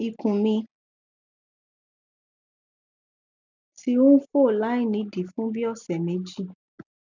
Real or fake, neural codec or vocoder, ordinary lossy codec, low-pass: real; none; none; 7.2 kHz